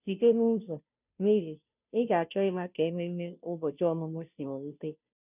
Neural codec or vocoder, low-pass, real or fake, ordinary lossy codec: codec, 16 kHz, 0.5 kbps, FunCodec, trained on Chinese and English, 25 frames a second; 3.6 kHz; fake; none